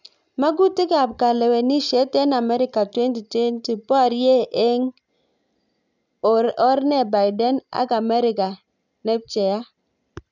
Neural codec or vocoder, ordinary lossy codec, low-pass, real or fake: none; none; 7.2 kHz; real